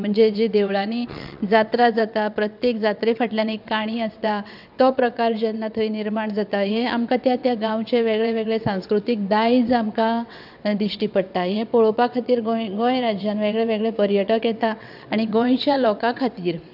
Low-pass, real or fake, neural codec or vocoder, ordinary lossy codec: 5.4 kHz; fake; vocoder, 22.05 kHz, 80 mel bands, WaveNeXt; none